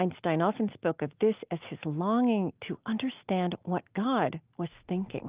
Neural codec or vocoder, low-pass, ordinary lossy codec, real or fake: none; 3.6 kHz; Opus, 32 kbps; real